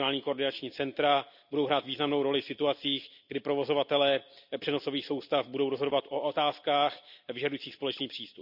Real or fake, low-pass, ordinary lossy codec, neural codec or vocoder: real; 5.4 kHz; none; none